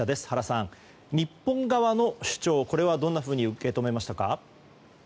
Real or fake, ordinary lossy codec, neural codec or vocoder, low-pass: real; none; none; none